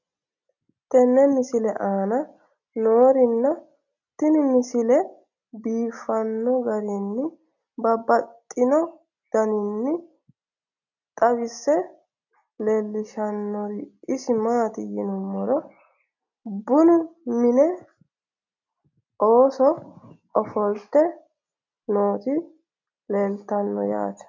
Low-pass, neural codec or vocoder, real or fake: 7.2 kHz; none; real